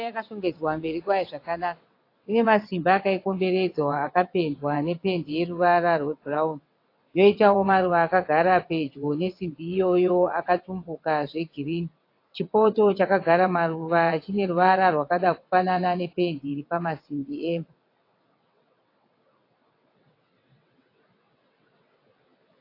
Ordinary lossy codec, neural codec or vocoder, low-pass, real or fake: AAC, 32 kbps; vocoder, 22.05 kHz, 80 mel bands, WaveNeXt; 5.4 kHz; fake